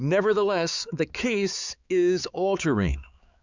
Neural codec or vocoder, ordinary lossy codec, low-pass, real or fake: codec, 16 kHz, 4 kbps, X-Codec, HuBERT features, trained on balanced general audio; Opus, 64 kbps; 7.2 kHz; fake